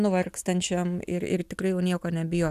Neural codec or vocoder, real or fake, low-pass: none; real; 14.4 kHz